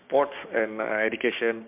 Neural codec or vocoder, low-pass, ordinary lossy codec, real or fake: codec, 16 kHz, 6 kbps, DAC; 3.6 kHz; MP3, 32 kbps; fake